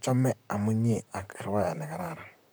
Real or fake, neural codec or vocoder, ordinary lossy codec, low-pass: fake; vocoder, 44.1 kHz, 128 mel bands, Pupu-Vocoder; none; none